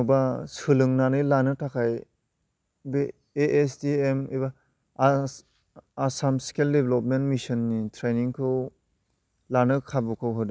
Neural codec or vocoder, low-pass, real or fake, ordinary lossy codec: none; none; real; none